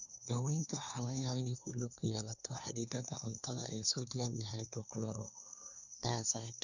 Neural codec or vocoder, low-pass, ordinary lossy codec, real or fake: codec, 24 kHz, 1 kbps, SNAC; 7.2 kHz; none; fake